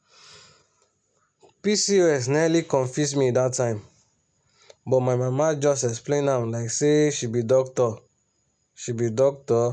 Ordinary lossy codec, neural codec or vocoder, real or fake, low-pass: none; none; real; 9.9 kHz